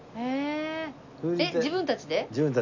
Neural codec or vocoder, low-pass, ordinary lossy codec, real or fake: none; 7.2 kHz; none; real